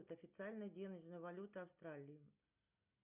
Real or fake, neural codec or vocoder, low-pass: real; none; 3.6 kHz